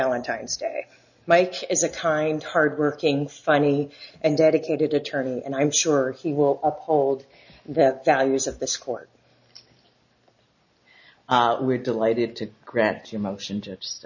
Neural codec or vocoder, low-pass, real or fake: none; 7.2 kHz; real